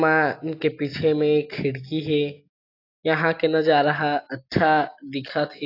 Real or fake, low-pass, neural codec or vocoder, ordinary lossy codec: real; 5.4 kHz; none; AAC, 32 kbps